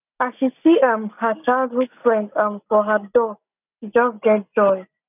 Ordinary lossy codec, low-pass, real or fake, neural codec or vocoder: none; 3.6 kHz; real; none